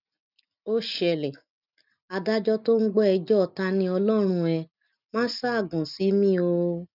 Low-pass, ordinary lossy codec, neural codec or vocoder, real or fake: 5.4 kHz; none; none; real